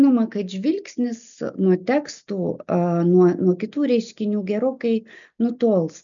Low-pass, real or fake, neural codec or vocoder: 7.2 kHz; real; none